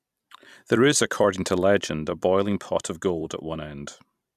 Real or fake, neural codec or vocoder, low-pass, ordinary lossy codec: fake; vocoder, 44.1 kHz, 128 mel bands every 256 samples, BigVGAN v2; 14.4 kHz; none